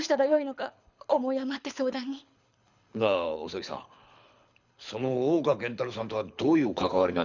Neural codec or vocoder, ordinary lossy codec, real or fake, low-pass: codec, 24 kHz, 6 kbps, HILCodec; none; fake; 7.2 kHz